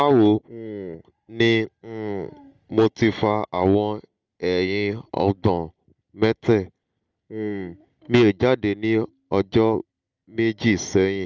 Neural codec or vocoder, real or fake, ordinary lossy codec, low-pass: none; real; Opus, 24 kbps; 7.2 kHz